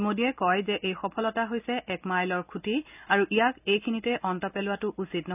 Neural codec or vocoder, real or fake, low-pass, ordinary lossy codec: none; real; 3.6 kHz; none